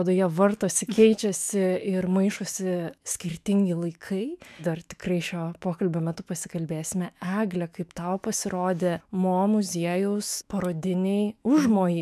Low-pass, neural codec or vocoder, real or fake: 14.4 kHz; autoencoder, 48 kHz, 128 numbers a frame, DAC-VAE, trained on Japanese speech; fake